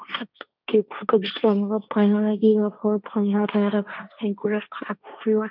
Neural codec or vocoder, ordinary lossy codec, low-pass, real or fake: codec, 16 kHz, 1.1 kbps, Voila-Tokenizer; none; 5.4 kHz; fake